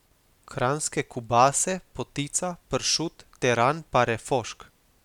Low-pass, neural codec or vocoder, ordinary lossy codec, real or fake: 19.8 kHz; none; none; real